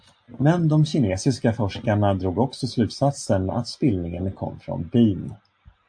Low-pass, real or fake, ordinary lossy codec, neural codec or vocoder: 9.9 kHz; real; AAC, 64 kbps; none